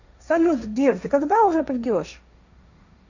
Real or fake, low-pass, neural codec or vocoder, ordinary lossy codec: fake; 7.2 kHz; codec, 16 kHz, 1.1 kbps, Voila-Tokenizer; none